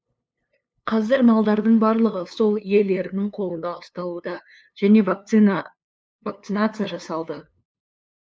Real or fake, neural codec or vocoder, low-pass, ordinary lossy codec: fake; codec, 16 kHz, 2 kbps, FunCodec, trained on LibriTTS, 25 frames a second; none; none